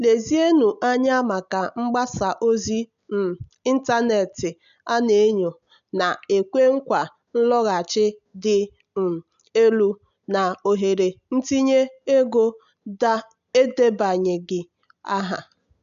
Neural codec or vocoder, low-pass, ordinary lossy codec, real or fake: none; 7.2 kHz; none; real